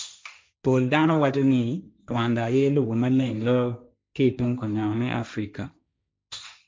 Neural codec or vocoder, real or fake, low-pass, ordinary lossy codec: codec, 16 kHz, 1.1 kbps, Voila-Tokenizer; fake; none; none